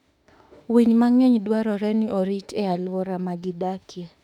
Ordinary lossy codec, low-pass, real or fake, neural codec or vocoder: none; 19.8 kHz; fake; autoencoder, 48 kHz, 32 numbers a frame, DAC-VAE, trained on Japanese speech